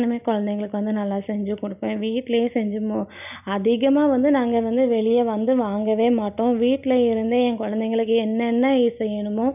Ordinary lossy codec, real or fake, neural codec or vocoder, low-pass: none; real; none; 3.6 kHz